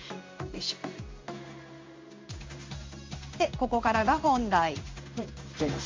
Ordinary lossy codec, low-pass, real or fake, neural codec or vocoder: MP3, 48 kbps; 7.2 kHz; fake; codec, 16 kHz in and 24 kHz out, 1 kbps, XY-Tokenizer